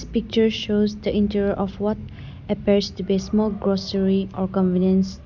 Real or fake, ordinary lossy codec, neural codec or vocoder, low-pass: real; none; none; 7.2 kHz